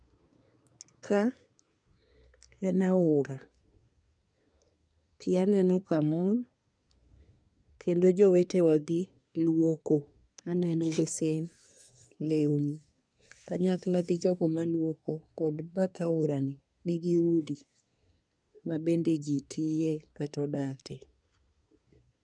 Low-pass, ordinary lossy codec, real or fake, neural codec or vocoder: 9.9 kHz; none; fake; codec, 24 kHz, 1 kbps, SNAC